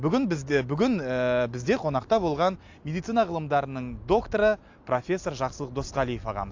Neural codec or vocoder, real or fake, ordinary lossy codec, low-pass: none; real; AAC, 48 kbps; 7.2 kHz